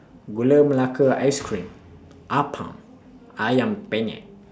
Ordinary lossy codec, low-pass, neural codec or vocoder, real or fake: none; none; none; real